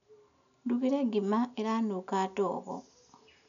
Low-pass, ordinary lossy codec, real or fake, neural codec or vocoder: 7.2 kHz; none; real; none